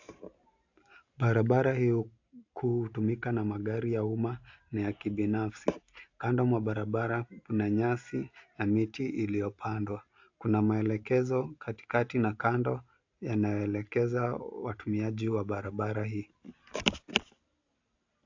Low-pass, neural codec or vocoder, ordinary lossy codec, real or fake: 7.2 kHz; none; AAC, 48 kbps; real